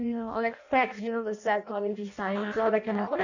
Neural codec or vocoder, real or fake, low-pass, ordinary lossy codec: codec, 16 kHz in and 24 kHz out, 0.6 kbps, FireRedTTS-2 codec; fake; 7.2 kHz; none